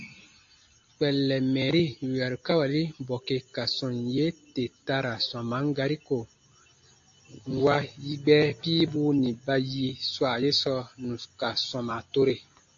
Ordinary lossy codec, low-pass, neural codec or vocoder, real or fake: AAC, 48 kbps; 7.2 kHz; none; real